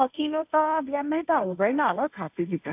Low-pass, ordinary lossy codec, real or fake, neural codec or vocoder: 3.6 kHz; AAC, 32 kbps; fake; codec, 16 kHz, 1.1 kbps, Voila-Tokenizer